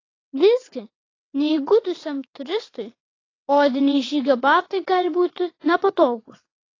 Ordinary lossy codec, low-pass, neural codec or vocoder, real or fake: AAC, 32 kbps; 7.2 kHz; vocoder, 22.05 kHz, 80 mel bands, WaveNeXt; fake